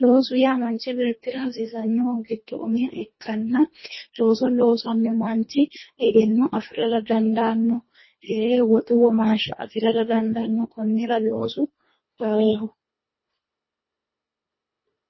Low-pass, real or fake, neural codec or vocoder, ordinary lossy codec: 7.2 kHz; fake; codec, 24 kHz, 1.5 kbps, HILCodec; MP3, 24 kbps